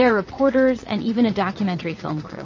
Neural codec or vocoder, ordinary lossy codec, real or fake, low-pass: vocoder, 22.05 kHz, 80 mel bands, WaveNeXt; MP3, 32 kbps; fake; 7.2 kHz